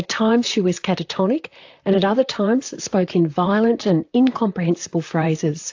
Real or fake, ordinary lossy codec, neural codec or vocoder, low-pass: fake; AAC, 48 kbps; vocoder, 44.1 kHz, 128 mel bands, Pupu-Vocoder; 7.2 kHz